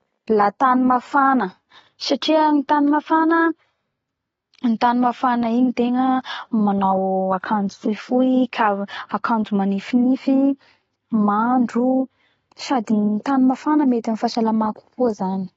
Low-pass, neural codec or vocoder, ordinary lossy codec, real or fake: 19.8 kHz; none; AAC, 24 kbps; real